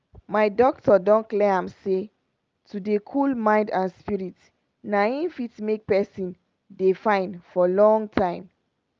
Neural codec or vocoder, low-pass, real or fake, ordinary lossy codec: none; 7.2 kHz; real; Opus, 24 kbps